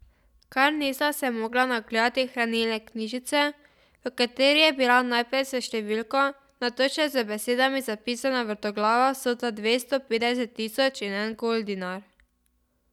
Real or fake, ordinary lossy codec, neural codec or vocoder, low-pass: real; none; none; 19.8 kHz